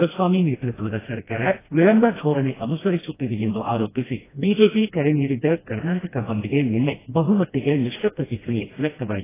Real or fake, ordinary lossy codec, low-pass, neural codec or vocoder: fake; AAC, 16 kbps; 3.6 kHz; codec, 16 kHz, 1 kbps, FreqCodec, smaller model